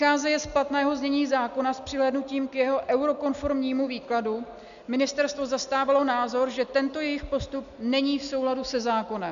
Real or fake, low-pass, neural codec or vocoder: real; 7.2 kHz; none